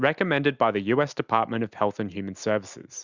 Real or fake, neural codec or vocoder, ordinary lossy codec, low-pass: real; none; Opus, 64 kbps; 7.2 kHz